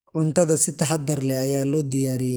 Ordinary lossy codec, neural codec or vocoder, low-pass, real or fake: none; codec, 44.1 kHz, 2.6 kbps, SNAC; none; fake